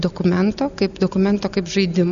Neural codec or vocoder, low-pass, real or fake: none; 7.2 kHz; real